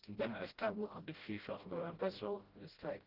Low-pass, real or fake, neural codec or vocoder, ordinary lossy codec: 5.4 kHz; fake; codec, 16 kHz, 0.5 kbps, FreqCodec, smaller model; Opus, 16 kbps